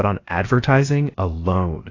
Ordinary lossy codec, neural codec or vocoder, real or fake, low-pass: AAC, 32 kbps; codec, 16 kHz, about 1 kbps, DyCAST, with the encoder's durations; fake; 7.2 kHz